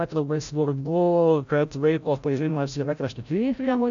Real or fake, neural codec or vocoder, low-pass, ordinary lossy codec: fake; codec, 16 kHz, 0.5 kbps, FreqCodec, larger model; 7.2 kHz; AAC, 64 kbps